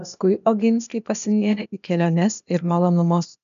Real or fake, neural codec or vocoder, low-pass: fake; codec, 16 kHz, 0.8 kbps, ZipCodec; 7.2 kHz